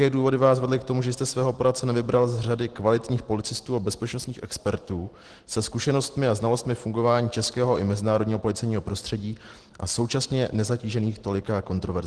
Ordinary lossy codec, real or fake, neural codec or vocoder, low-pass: Opus, 16 kbps; real; none; 10.8 kHz